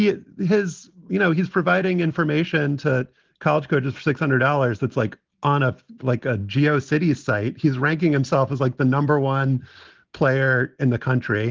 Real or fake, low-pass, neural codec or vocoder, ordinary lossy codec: real; 7.2 kHz; none; Opus, 16 kbps